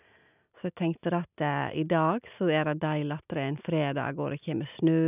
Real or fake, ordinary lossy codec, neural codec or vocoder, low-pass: real; none; none; 3.6 kHz